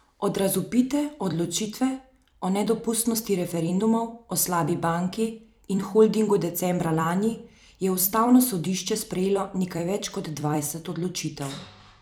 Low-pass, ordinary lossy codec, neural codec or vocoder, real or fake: none; none; vocoder, 44.1 kHz, 128 mel bands every 256 samples, BigVGAN v2; fake